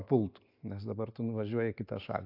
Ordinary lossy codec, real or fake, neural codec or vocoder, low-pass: AAC, 32 kbps; real; none; 5.4 kHz